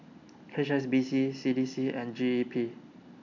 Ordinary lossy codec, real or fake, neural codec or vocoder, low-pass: none; real; none; 7.2 kHz